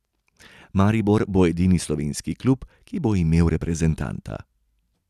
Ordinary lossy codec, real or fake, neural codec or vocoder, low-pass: none; real; none; 14.4 kHz